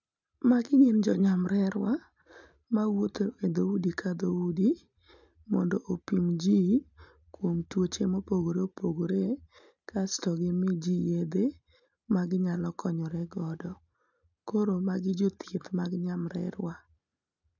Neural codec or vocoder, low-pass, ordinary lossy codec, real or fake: none; 7.2 kHz; none; real